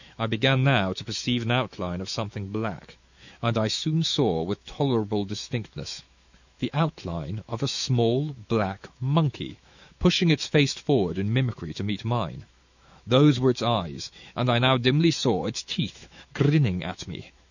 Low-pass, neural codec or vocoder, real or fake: 7.2 kHz; autoencoder, 48 kHz, 128 numbers a frame, DAC-VAE, trained on Japanese speech; fake